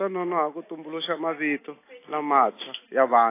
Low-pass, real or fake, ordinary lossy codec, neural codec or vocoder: 3.6 kHz; real; AAC, 24 kbps; none